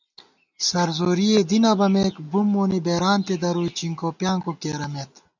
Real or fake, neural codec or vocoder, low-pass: real; none; 7.2 kHz